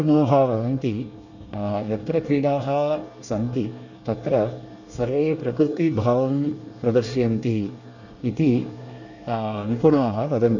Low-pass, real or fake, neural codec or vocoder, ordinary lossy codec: 7.2 kHz; fake; codec, 24 kHz, 1 kbps, SNAC; none